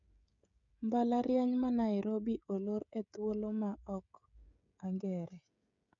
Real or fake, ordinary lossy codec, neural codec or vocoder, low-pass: fake; none; codec, 16 kHz, 16 kbps, FreqCodec, smaller model; 7.2 kHz